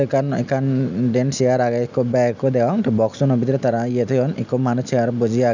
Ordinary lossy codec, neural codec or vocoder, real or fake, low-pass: none; none; real; 7.2 kHz